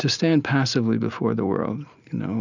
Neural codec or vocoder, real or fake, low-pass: none; real; 7.2 kHz